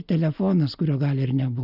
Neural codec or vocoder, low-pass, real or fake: none; 5.4 kHz; real